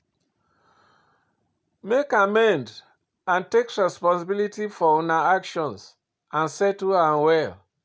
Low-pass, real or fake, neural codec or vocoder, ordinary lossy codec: none; real; none; none